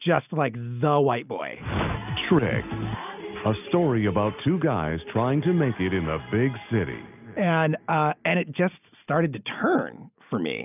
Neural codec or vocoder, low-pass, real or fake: none; 3.6 kHz; real